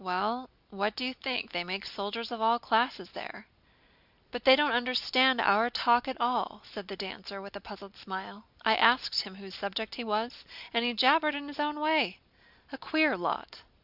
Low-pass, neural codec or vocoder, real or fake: 5.4 kHz; none; real